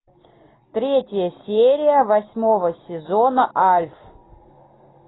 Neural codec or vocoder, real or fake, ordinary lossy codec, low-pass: none; real; AAC, 16 kbps; 7.2 kHz